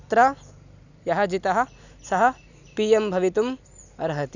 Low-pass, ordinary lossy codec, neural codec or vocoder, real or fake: 7.2 kHz; none; none; real